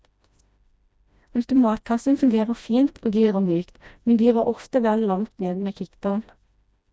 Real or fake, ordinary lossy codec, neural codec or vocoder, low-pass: fake; none; codec, 16 kHz, 1 kbps, FreqCodec, smaller model; none